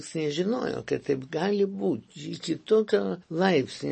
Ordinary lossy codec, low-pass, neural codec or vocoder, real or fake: MP3, 32 kbps; 10.8 kHz; none; real